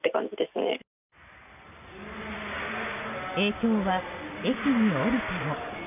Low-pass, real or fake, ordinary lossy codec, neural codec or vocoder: 3.6 kHz; real; none; none